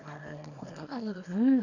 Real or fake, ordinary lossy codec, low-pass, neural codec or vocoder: fake; none; 7.2 kHz; codec, 24 kHz, 0.9 kbps, WavTokenizer, small release